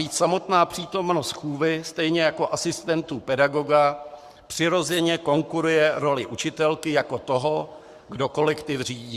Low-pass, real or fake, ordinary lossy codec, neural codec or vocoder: 14.4 kHz; fake; Opus, 64 kbps; codec, 44.1 kHz, 7.8 kbps, Pupu-Codec